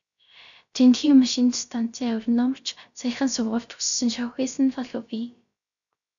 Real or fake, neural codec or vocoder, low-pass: fake; codec, 16 kHz, 0.3 kbps, FocalCodec; 7.2 kHz